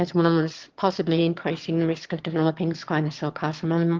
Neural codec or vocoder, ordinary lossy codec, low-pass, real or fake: autoencoder, 22.05 kHz, a latent of 192 numbers a frame, VITS, trained on one speaker; Opus, 16 kbps; 7.2 kHz; fake